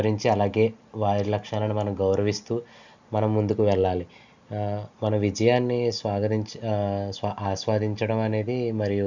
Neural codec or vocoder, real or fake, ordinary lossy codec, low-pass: none; real; none; 7.2 kHz